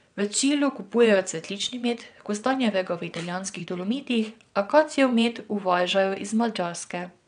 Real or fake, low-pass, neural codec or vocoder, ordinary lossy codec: fake; 9.9 kHz; vocoder, 22.05 kHz, 80 mel bands, WaveNeXt; none